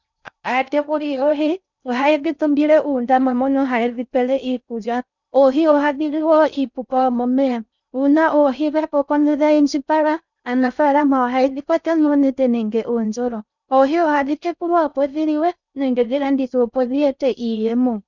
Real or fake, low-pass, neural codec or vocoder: fake; 7.2 kHz; codec, 16 kHz in and 24 kHz out, 0.6 kbps, FocalCodec, streaming, 4096 codes